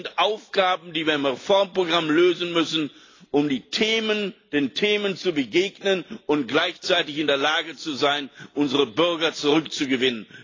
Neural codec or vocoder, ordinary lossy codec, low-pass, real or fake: none; AAC, 32 kbps; 7.2 kHz; real